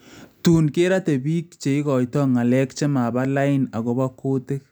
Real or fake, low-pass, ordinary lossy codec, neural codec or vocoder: real; none; none; none